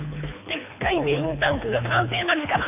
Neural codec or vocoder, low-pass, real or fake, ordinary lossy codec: codec, 24 kHz, 3 kbps, HILCodec; 3.6 kHz; fake; none